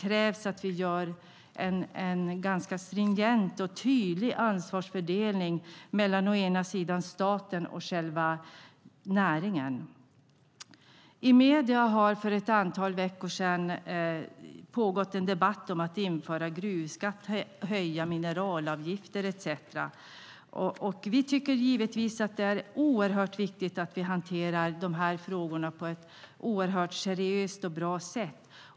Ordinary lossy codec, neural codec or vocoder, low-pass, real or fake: none; none; none; real